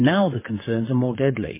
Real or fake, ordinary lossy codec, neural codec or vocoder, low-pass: fake; MP3, 16 kbps; vocoder, 22.05 kHz, 80 mel bands, WaveNeXt; 3.6 kHz